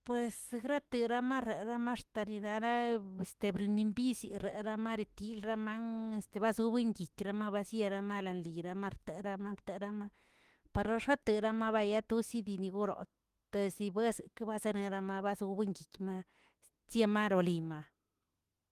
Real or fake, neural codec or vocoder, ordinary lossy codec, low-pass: fake; autoencoder, 48 kHz, 32 numbers a frame, DAC-VAE, trained on Japanese speech; Opus, 24 kbps; 14.4 kHz